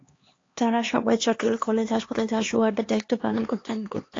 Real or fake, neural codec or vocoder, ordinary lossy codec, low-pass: fake; codec, 16 kHz, 2 kbps, X-Codec, HuBERT features, trained on LibriSpeech; AAC, 32 kbps; 7.2 kHz